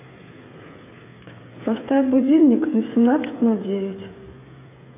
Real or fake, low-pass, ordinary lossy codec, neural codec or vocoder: fake; 3.6 kHz; AAC, 24 kbps; codec, 16 kHz, 16 kbps, FreqCodec, smaller model